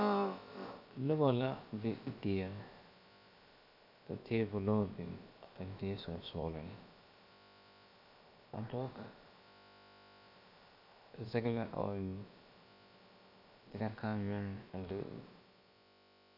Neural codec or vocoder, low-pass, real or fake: codec, 16 kHz, about 1 kbps, DyCAST, with the encoder's durations; 5.4 kHz; fake